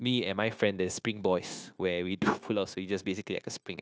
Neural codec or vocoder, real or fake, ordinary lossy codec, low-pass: codec, 16 kHz, 0.9 kbps, LongCat-Audio-Codec; fake; none; none